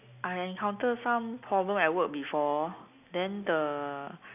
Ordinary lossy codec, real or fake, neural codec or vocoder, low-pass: none; real; none; 3.6 kHz